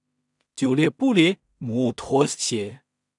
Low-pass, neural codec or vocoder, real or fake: 10.8 kHz; codec, 16 kHz in and 24 kHz out, 0.4 kbps, LongCat-Audio-Codec, two codebook decoder; fake